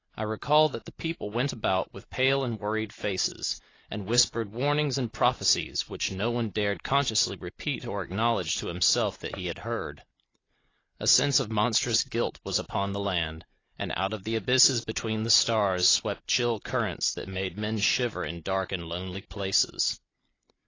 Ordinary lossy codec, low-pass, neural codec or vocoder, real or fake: AAC, 32 kbps; 7.2 kHz; none; real